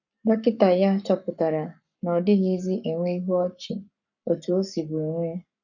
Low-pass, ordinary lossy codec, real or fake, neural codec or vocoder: 7.2 kHz; none; fake; codec, 44.1 kHz, 7.8 kbps, Pupu-Codec